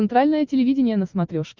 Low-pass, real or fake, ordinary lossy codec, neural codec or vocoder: 7.2 kHz; real; Opus, 24 kbps; none